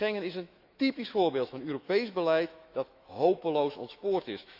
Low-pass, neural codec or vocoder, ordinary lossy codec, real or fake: 5.4 kHz; autoencoder, 48 kHz, 128 numbers a frame, DAC-VAE, trained on Japanese speech; Opus, 64 kbps; fake